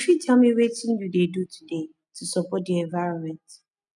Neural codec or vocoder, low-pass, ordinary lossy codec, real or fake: none; 10.8 kHz; none; real